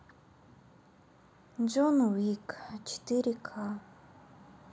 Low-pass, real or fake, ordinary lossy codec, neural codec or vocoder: none; real; none; none